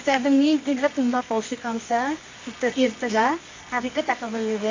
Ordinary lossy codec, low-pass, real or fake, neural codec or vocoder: MP3, 48 kbps; 7.2 kHz; fake; codec, 24 kHz, 0.9 kbps, WavTokenizer, medium music audio release